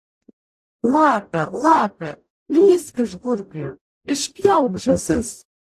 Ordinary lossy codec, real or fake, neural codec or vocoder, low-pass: AAC, 64 kbps; fake; codec, 44.1 kHz, 0.9 kbps, DAC; 14.4 kHz